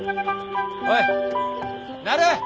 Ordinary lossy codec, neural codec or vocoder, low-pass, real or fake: none; none; none; real